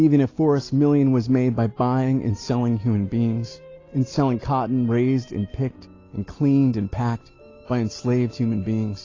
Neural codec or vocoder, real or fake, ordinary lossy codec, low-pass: none; real; AAC, 32 kbps; 7.2 kHz